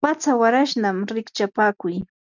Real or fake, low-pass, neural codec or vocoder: real; 7.2 kHz; none